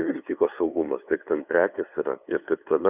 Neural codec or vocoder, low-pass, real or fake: codec, 16 kHz, 2 kbps, FunCodec, trained on LibriTTS, 25 frames a second; 3.6 kHz; fake